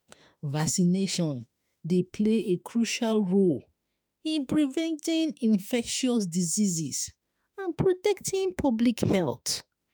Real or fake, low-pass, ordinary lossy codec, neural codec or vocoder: fake; none; none; autoencoder, 48 kHz, 32 numbers a frame, DAC-VAE, trained on Japanese speech